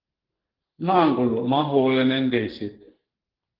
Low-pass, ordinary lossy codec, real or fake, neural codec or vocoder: 5.4 kHz; Opus, 16 kbps; fake; codec, 44.1 kHz, 2.6 kbps, SNAC